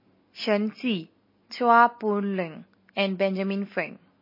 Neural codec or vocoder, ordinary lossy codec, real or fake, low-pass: none; MP3, 24 kbps; real; 5.4 kHz